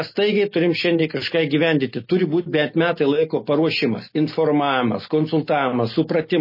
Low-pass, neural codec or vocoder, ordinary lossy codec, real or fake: 5.4 kHz; none; MP3, 24 kbps; real